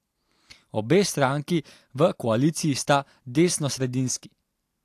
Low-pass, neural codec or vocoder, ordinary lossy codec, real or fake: 14.4 kHz; none; AAC, 64 kbps; real